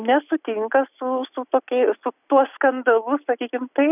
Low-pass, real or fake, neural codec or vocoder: 3.6 kHz; real; none